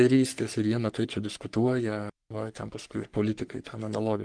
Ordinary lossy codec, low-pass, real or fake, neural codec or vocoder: Opus, 24 kbps; 9.9 kHz; fake; codec, 44.1 kHz, 3.4 kbps, Pupu-Codec